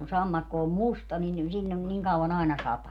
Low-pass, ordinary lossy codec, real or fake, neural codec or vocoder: 19.8 kHz; none; real; none